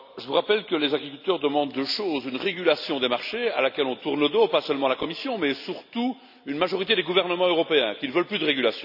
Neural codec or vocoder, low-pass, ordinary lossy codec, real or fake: none; 5.4 kHz; none; real